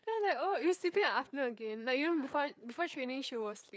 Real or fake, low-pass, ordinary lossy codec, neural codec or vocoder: fake; none; none; codec, 16 kHz, 4 kbps, FreqCodec, larger model